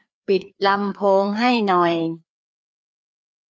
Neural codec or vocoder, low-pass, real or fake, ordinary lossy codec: codec, 16 kHz, 2 kbps, FreqCodec, larger model; none; fake; none